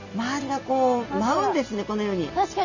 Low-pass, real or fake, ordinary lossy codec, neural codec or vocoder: 7.2 kHz; real; none; none